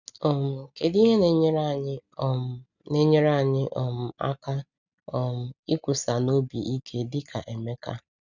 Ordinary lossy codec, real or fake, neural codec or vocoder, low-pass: none; real; none; 7.2 kHz